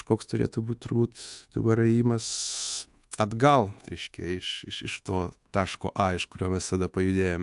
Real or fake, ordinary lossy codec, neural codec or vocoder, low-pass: fake; AAC, 64 kbps; codec, 24 kHz, 1.2 kbps, DualCodec; 10.8 kHz